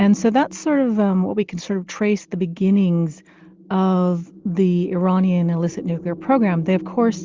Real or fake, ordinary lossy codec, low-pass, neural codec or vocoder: real; Opus, 32 kbps; 7.2 kHz; none